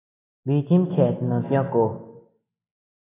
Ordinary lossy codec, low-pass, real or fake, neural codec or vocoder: AAC, 16 kbps; 3.6 kHz; real; none